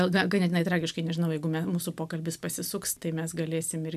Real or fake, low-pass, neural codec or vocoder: real; 14.4 kHz; none